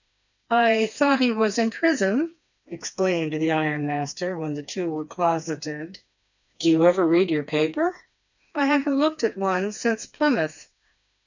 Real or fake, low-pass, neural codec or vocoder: fake; 7.2 kHz; codec, 16 kHz, 2 kbps, FreqCodec, smaller model